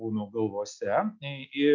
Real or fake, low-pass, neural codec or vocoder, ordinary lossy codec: real; 7.2 kHz; none; MP3, 64 kbps